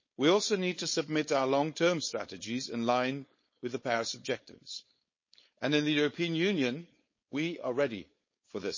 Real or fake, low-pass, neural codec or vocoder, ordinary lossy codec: fake; 7.2 kHz; codec, 16 kHz, 4.8 kbps, FACodec; MP3, 32 kbps